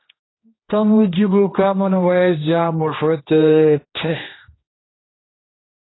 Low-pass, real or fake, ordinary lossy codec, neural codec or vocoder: 7.2 kHz; fake; AAC, 16 kbps; codec, 16 kHz, 1 kbps, X-Codec, HuBERT features, trained on general audio